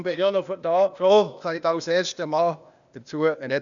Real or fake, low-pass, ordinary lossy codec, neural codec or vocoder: fake; 7.2 kHz; none; codec, 16 kHz, 0.8 kbps, ZipCodec